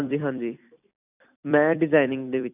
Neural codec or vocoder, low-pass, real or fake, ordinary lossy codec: vocoder, 44.1 kHz, 128 mel bands every 256 samples, BigVGAN v2; 3.6 kHz; fake; none